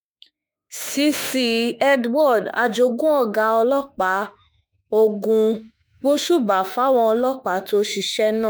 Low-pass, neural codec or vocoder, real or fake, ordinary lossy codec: none; autoencoder, 48 kHz, 32 numbers a frame, DAC-VAE, trained on Japanese speech; fake; none